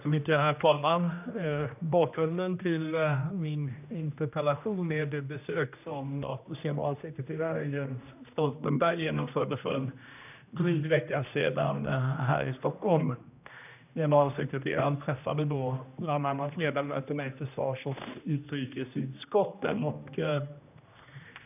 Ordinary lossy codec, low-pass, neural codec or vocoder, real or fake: none; 3.6 kHz; codec, 16 kHz, 1 kbps, X-Codec, HuBERT features, trained on general audio; fake